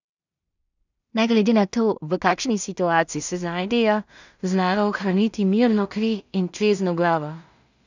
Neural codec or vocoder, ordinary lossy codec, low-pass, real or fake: codec, 16 kHz in and 24 kHz out, 0.4 kbps, LongCat-Audio-Codec, two codebook decoder; none; 7.2 kHz; fake